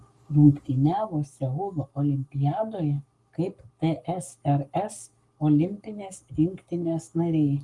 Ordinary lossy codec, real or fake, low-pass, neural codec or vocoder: Opus, 32 kbps; fake; 10.8 kHz; codec, 44.1 kHz, 7.8 kbps, Pupu-Codec